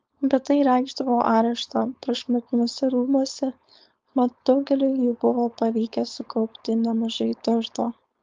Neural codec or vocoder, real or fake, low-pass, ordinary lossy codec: codec, 16 kHz, 4.8 kbps, FACodec; fake; 7.2 kHz; Opus, 24 kbps